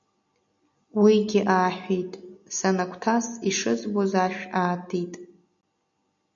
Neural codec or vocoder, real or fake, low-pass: none; real; 7.2 kHz